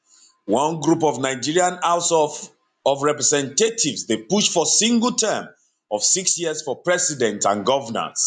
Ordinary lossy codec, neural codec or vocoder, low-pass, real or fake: MP3, 96 kbps; none; 9.9 kHz; real